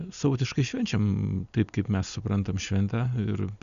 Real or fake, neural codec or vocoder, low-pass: real; none; 7.2 kHz